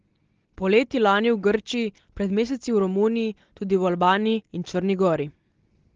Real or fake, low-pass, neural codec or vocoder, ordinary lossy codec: real; 7.2 kHz; none; Opus, 16 kbps